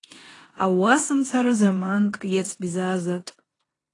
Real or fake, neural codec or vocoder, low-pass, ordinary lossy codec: fake; codec, 16 kHz in and 24 kHz out, 0.9 kbps, LongCat-Audio-Codec, four codebook decoder; 10.8 kHz; AAC, 32 kbps